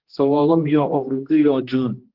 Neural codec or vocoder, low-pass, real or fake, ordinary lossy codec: codec, 16 kHz, 1 kbps, X-Codec, HuBERT features, trained on general audio; 5.4 kHz; fake; Opus, 16 kbps